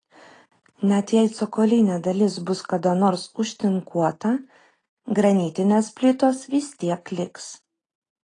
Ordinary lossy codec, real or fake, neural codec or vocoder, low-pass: AAC, 32 kbps; real; none; 9.9 kHz